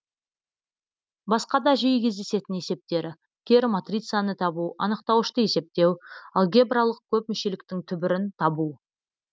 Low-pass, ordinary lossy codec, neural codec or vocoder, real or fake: none; none; none; real